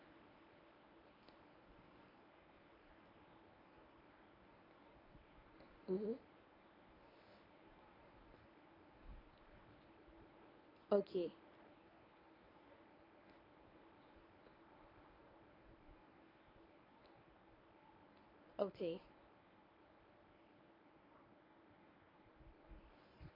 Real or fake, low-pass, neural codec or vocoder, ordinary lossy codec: fake; 5.4 kHz; codec, 24 kHz, 0.9 kbps, WavTokenizer, medium speech release version 1; AAC, 24 kbps